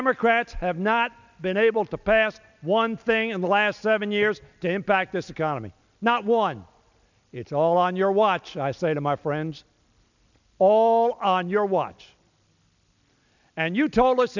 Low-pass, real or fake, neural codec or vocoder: 7.2 kHz; real; none